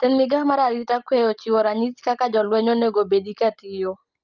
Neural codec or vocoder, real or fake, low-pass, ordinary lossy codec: none; real; 7.2 kHz; Opus, 32 kbps